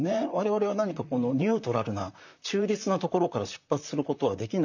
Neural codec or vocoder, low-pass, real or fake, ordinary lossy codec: vocoder, 44.1 kHz, 128 mel bands, Pupu-Vocoder; 7.2 kHz; fake; none